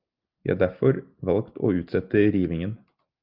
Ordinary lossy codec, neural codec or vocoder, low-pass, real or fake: Opus, 24 kbps; none; 5.4 kHz; real